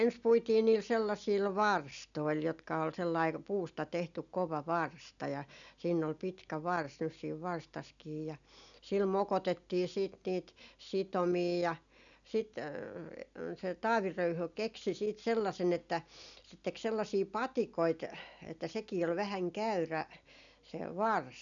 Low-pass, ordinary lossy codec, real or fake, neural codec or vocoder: 7.2 kHz; none; real; none